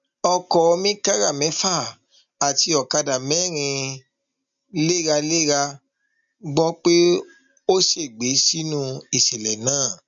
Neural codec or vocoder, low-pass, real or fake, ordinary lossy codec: none; 7.2 kHz; real; MP3, 96 kbps